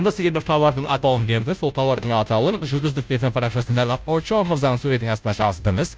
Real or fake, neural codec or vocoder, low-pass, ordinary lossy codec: fake; codec, 16 kHz, 0.5 kbps, FunCodec, trained on Chinese and English, 25 frames a second; none; none